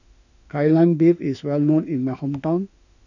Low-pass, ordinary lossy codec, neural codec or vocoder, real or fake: 7.2 kHz; none; autoencoder, 48 kHz, 32 numbers a frame, DAC-VAE, trained on Japanese speech; fake